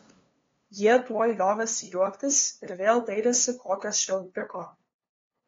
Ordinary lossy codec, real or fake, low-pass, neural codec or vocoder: AAC, 32 kbps; fake; 7.2 kHz; codec, 16 kHz, 2 kbps, FunCodec, trained on LibriTTS, 25 frames a second